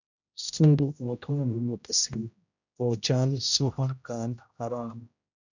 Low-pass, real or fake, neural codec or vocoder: 7.2 kHz; fake; codec, 16 kHz, 0.5 kbps, X-Codec, HuBERT features, trained on general audio